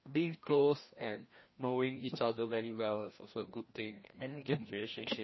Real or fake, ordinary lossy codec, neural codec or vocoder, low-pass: fake; MP3, 24 kbps; codec, 16 kHz, 1 kbps, FreqCodec, larger model; 7.2 kHz